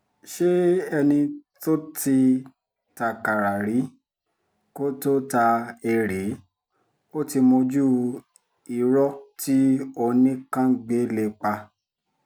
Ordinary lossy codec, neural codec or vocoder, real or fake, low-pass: none; none; real; none